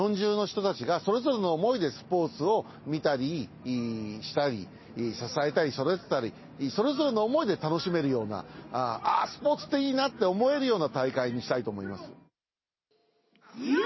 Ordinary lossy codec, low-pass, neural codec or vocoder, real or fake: MP3, 24 kbps; 7.2 kHz; none; real